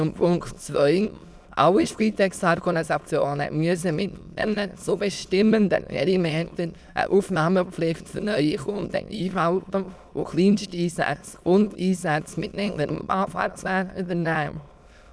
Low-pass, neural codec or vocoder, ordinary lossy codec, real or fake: none; autoencoder, 22.05 kHz, a latent of 192 numbers a frame, VITS, trained on many speakers; none; fake